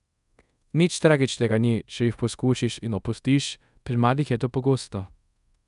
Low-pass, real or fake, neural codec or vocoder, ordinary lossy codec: 10.8 kHz; fake; codec, 24 kHz, 0.5 kbps, DualCodec; none